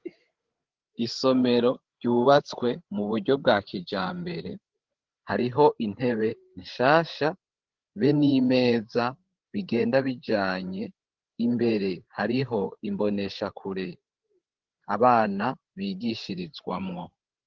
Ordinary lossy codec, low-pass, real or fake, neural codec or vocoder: Opus, 16 kbps; 7.2 kHz; fake; codec, 16 kHz, 8 kbps, FreqCodec, larger model